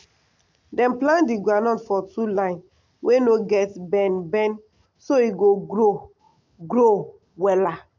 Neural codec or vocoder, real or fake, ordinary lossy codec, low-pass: none; real; MP3, 48 kbps; 7.2 kHz